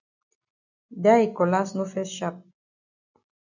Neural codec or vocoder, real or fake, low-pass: none; real; 7.2 kHz